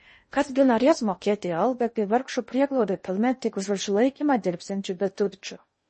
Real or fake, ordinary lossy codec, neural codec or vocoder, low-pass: fake; MP3, 32 kbps; codec, 16 kHz in and 24 kHz out, 0.6 kbps, FocalCodec, streaming, 2048 codes; 10.8 kHz